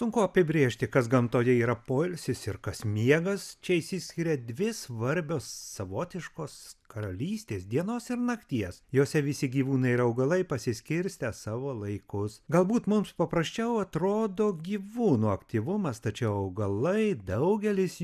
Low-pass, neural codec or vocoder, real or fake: 14.4 kHz; none; real